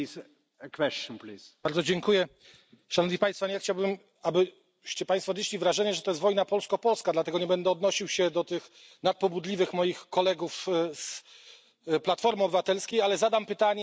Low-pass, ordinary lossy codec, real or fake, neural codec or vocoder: none; none; real; none